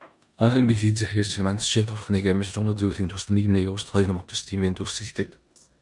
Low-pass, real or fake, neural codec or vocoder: 10.8 kHz; fake; codec, 16 kHz in and 24 kHz out, 0.9 kbps, LongCat-Audio-Codec, four codebook decoder